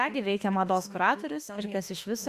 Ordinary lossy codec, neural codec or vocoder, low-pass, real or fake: Opus, 64 kbps; autoencoder, 48 kHz, 32 numbers a frame, DAC-VAE, trained on Japanese speech; 14.4 kHz; fake